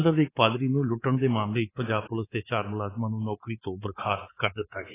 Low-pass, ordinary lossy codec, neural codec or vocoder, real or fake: 3.6 kHz; AAC, 16 kbps; codec, 24 kHz, 3.1 kbps, DualCodec; fake